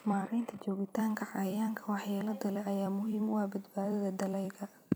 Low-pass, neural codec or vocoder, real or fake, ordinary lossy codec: none; vocoder, 44.1 kHz, 128 mel bands every 256 samples, BigVGAN v2; fake; none